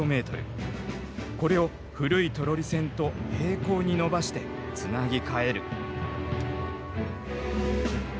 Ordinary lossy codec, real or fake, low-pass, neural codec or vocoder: none; real; none; none